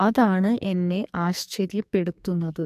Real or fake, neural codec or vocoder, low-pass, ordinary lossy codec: fake; codec, 44.1 kHz, 2.6 kbps, SNAC; 14.4 kHz; AAC, 96 kbps